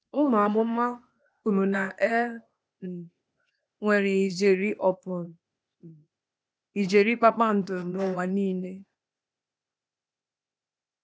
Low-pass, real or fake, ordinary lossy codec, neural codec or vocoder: none; fake; none; codec, 16 kHz, 0.8 kbps, ZipCodec